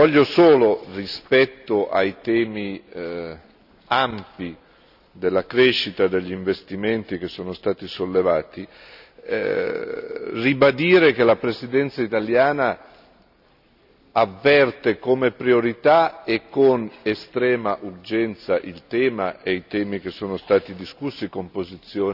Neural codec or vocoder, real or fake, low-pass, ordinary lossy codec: none; real; 5.4 kHz; none